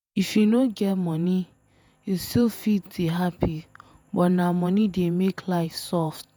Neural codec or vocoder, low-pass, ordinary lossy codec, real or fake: vocoder, 48 kHz, 128 mel bands, Vocos; none; none; fake